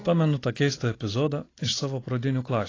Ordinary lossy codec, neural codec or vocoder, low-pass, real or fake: AAC, 32 kbps; none; 7.2 kHz; real